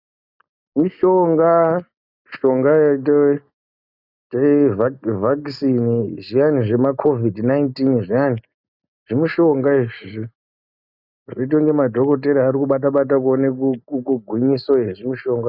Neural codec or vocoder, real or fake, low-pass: none; real; 5.4 kHz